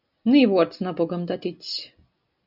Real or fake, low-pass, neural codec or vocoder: real; 5.4 kHz; none